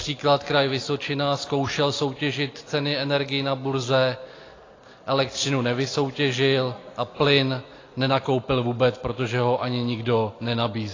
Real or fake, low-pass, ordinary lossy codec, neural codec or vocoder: real; 7.2 kHz; AAC, 32 kbps; none